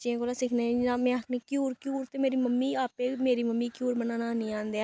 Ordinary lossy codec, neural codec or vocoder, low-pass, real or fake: none; none; none; real